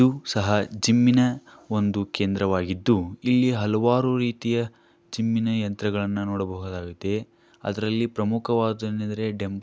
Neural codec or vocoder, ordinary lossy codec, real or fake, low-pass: none; none; real; none